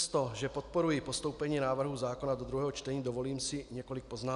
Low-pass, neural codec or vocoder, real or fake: 10.8 kHz; none; real